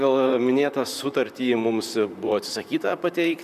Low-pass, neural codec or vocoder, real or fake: 14.4 kHz; vocoder, 44.1 kHz, 128 mel bands, Pupu-Vocoder; fake